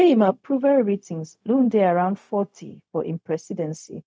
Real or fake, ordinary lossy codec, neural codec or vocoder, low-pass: fake; none; codec, 16 kHz, 0.4 kbps, LongCat-Audio-Codec; none